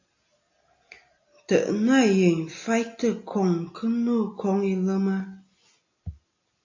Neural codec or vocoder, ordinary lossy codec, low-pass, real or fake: none; AAC, 48 kbps; 7.2 kHz; real